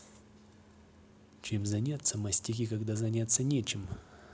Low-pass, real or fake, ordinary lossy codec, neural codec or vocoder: none; real; none; none